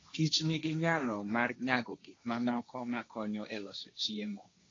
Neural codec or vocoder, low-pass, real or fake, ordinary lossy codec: codec, 16 kHz, 1.1 kbps, Voila-Tokenizer; 7.2 kHz; fake; AAC, 32 kbps